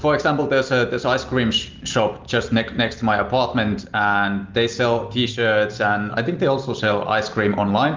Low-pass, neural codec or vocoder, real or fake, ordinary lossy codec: 7.2 kHz; none; real; Opus, 24 kbps